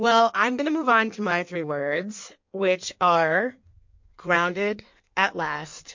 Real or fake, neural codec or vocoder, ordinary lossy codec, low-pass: fake; codec, 16 kHz in and 24 kHz out, 1.1 kbps, FireRedTTS-2 codec; MP3, 48 kbps; 7.2 kHz